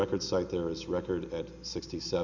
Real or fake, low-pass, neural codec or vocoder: real; 7.2 kHz; none